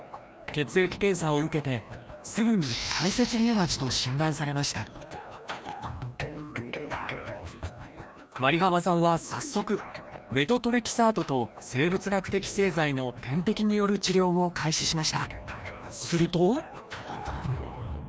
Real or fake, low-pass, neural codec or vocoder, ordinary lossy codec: fake; none; codec, 16 kHz, 1 kbps, FreqCodec, larger model; none